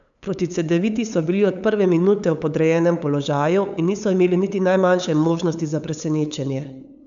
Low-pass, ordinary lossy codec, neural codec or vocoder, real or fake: 7.2 kHz; none; codec, 16 kHz, 8 kbps, FunCodec, trained on LibriTTS, 25 frames a second; fake